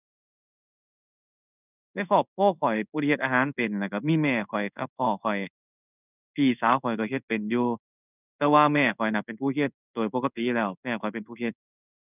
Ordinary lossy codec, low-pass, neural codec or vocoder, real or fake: none; 3.6 kHz; codec, 16 kHz in and 24 kHz out, 1 kbps, XY-Tokenizer; fake